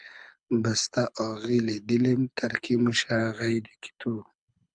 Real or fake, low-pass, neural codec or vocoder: fake; 9.9 kHz; codec, 24 kHz, 6 kbps, HILCodec